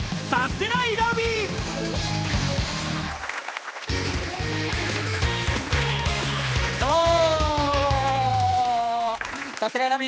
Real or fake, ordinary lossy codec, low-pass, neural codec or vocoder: fake; none; none; codec, 16 kHz, 2 kbps, X-Codec, HuBERT features, trained on general audio